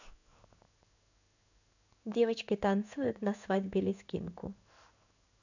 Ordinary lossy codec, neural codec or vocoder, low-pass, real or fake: none; codec, 16 kHz in and 24 kHz out, 1 kbps, XY-Tokenizer; 7.2 kHz; fake